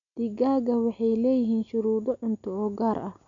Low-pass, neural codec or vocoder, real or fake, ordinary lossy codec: 7.2 kHz; none; real; none